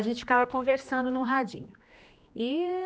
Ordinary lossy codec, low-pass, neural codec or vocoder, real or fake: none; none; codec, 16 kHz, 2 kbps, X-Codec, HuBERT features, trained on general audio; fake